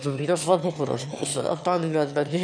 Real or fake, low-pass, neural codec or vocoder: fake; 9.9 kHz; autoencoder, 22.05 kHz, a latent of 192 numbers a frame, VITS, trained on one speaker